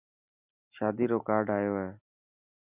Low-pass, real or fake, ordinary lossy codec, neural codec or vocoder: 3.6 kHz; real; Opus, 64 kbps; none